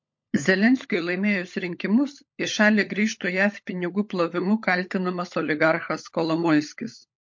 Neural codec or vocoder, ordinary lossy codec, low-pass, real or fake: codec, 16 kHz, 16 kbps, FunCodec, trained on LibriTTS, 50 frames a second; MP3, 48 kbps; 7.2 kHz; fake